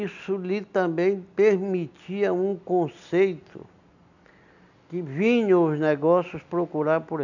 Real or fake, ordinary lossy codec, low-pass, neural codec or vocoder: real; none; 7.2 kHz; none